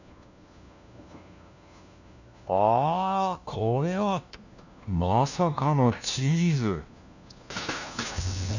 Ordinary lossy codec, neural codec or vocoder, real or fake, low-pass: none; codec, 16 kHz, 1 kbps, FunCodec, trained on LibriTTS, 50 frames a second; fake; 7.2 kHz